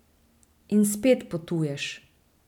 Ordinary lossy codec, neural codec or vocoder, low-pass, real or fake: none; none; 19.8 kHz; real